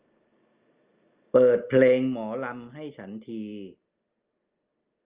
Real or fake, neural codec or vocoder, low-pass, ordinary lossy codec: real; none; 3.6 kHz; Opus, 32 kbps